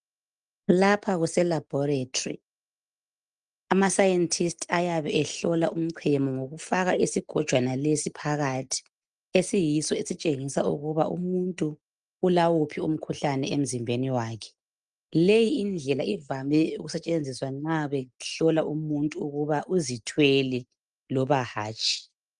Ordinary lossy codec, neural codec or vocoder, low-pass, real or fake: Opus, 32 kbps; none; 9.9 kHz; real